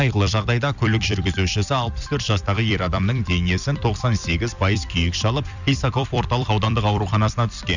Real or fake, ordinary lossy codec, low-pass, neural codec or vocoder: fake; none; 7.2 kHz; vocoder, 44.1 kHz, 128 mel bands every 256 samples, BigVGAN v2